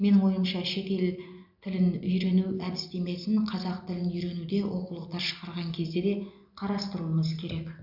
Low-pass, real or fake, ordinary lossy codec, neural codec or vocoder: 5.4 kHz; real; AAC, 48 kbps; none